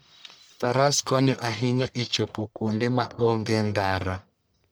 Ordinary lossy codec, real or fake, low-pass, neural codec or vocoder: none; fake; none; codec, 44.1 kHz, 1.7 kbps, Pupu-Codec